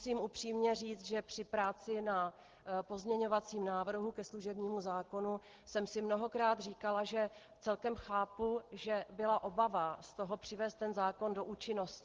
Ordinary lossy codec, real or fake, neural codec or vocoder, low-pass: Opus, 16 kbps; real; none; 7.2 kHz